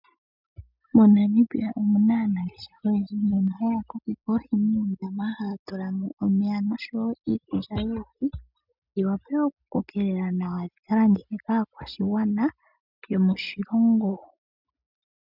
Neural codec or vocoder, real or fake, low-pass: none; real; 5.4 kHz